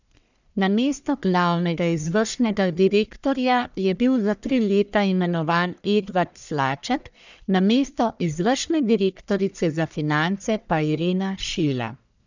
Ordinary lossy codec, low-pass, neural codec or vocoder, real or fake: none; 7.2 kHz; codec, 44.1 kHz, 1.7 kbps, Pupu-Codec; fake